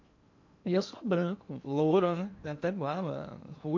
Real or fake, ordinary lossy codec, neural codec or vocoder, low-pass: fake; MP3, 48 kbps; codec, 16 kHz in and 24 kHz out, 0.8 kbps, FocalCodec, streaming, 65536 codes; 7.2 kHz